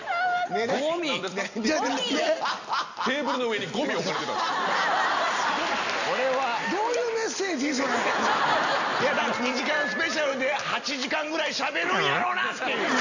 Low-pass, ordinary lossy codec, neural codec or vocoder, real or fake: 7.2 kHz; none; none; real